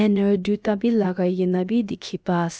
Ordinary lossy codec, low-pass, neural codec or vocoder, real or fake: none; none; codec, 16 kHz, about 1 kbps, DyCAST, with the encoder's durations; fake